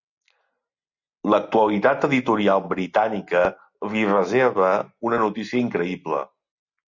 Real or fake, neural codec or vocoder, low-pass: real; none; 7.2 kHz